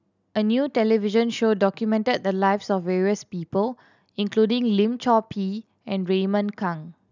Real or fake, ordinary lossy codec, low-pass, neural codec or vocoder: real; none; 7.2 kHz; none